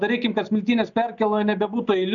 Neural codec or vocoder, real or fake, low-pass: none; real; 7.2 kHz